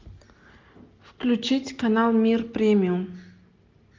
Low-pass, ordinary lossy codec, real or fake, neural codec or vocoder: 7.2 kHz; Opus, 24 kbps; real; none